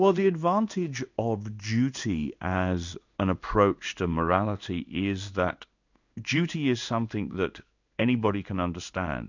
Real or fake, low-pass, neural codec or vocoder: fake; 7.2 kHz; codec, 16 kHz in and 24 kHz out, 1 kbps, XY-Tokenizer